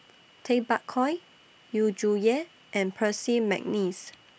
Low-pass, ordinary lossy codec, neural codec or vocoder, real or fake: none; none; none; real